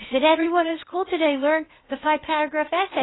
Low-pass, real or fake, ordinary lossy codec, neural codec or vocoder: 7.2 kHz; fake; AAC, 16 kbps; codec, 16 kHz, 0.8 kbps, ZipCodec